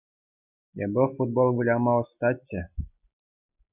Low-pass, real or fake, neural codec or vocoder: 3.6 kHz; real; none